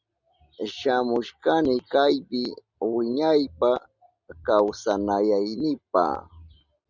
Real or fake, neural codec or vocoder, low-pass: real; none; 7.2 kHz